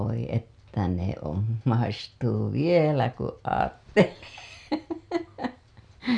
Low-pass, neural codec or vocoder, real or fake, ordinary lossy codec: 9.9 kHz; none; real; none